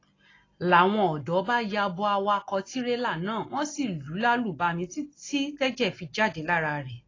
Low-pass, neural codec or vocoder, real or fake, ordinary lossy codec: 7.2 kHz; none; real; AAC, 32 kbps